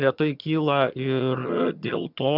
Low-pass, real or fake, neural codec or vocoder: 5.4 kHz; fake; vocoder, 22.05 kHz, 80 mel bands, HiFi-GAN